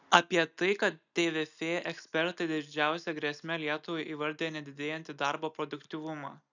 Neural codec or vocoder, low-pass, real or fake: none; 7.2 kHz; real